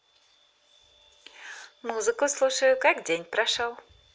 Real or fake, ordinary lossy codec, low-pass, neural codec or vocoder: real; none; none; none